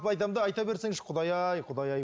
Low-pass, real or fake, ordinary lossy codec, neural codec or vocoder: none; real; none; none